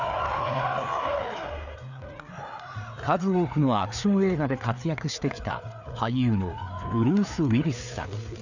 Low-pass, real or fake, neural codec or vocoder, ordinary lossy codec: 7.2 kHz; fake; codec, 16 kHz, 4 kbps, FreqCodec, larger model; none